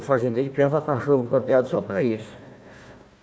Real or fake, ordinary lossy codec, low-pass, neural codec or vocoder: fake; none; none; codec, 16 kHz, 1 kbps, FunCodec, trained on Chinese and English, 50 frames a second